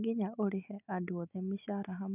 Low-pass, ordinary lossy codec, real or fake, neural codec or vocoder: 3.6 kHz; none; real; none